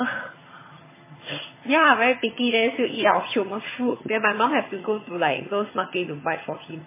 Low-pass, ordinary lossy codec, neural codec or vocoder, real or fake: 3.6 kHz; MP3, 16 kbps; vocoder, 22.05 kHz, 80 mel bands, HiFi-GAN; fake